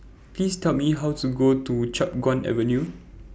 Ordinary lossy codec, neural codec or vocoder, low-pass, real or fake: none; none; none; real